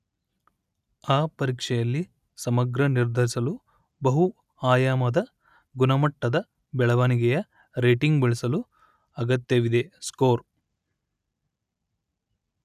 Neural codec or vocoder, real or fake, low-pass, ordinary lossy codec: none; real; 14.4 kHz; none